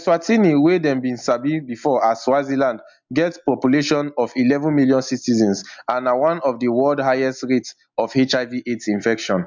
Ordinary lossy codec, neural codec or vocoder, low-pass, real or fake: MP3, 64 kbps; none; 7.2 kHz; real